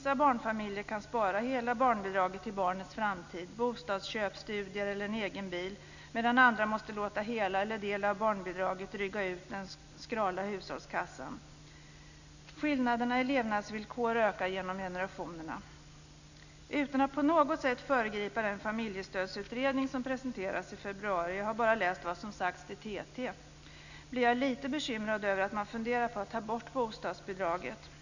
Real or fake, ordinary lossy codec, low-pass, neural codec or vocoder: real; none; 7.2 kHz; none